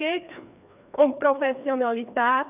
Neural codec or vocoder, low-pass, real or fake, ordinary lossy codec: codec, 16 kHz, 2 kbps, FreqCodec, larger model; 3.6 kHz; fake; none